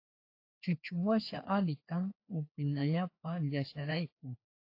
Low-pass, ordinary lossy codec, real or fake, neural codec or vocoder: 5.4 kHz; AAC, 32 kbps; fake; codec, 16 kHz, 2 kbps, FreqCodec, larger model